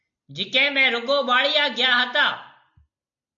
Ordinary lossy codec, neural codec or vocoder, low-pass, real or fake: MP3, 64 kbps; none; 7.2 kHz; real